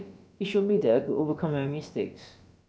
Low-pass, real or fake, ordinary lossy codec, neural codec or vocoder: none; fake; none; codec, 16 kHz, about 1 kbps, DyCAST, with the encoder's durations